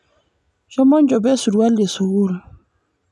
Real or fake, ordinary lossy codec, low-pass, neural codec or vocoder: real; none; none; none